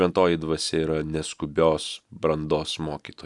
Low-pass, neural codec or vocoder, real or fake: 10.8 kHz; none; real